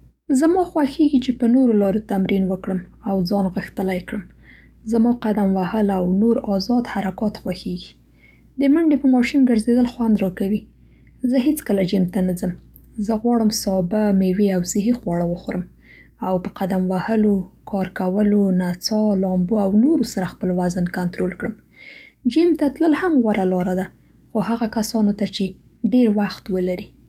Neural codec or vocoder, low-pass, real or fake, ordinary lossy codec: codec, 44.1 kHz, 7.8 kbps, DAC; 19.8 kHz; fake; none